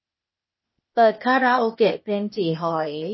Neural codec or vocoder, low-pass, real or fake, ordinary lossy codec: codec, 16 kHz, 0.8 kbps, ZipCodec; 7.2 kHz; fake; MP3, 24 kbps